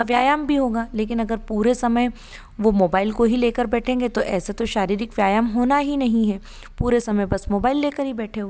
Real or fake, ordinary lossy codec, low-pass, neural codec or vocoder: real; none; none; none